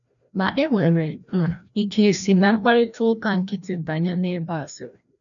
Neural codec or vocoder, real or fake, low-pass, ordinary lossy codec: codec, 16 kHz, 1 kbps, FreqCodec, larger model; fake; 7.2 kHz; none